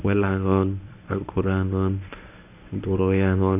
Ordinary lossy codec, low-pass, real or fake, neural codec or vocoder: none; 3.6 kHz; fake; codec, 24 kHz, 0.9 kbps, WavTokenizer, medium speech release version 1